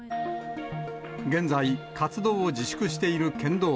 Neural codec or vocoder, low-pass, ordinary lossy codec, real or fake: none; none; none; real